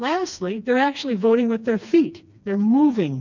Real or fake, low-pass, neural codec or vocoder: fake; 7.2 kHz; codec, 16 kHz, 2 kbps, FreqCodec, smaller model